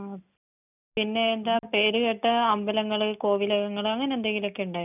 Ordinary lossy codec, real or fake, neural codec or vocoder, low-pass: none; real; none; 3.6 kHz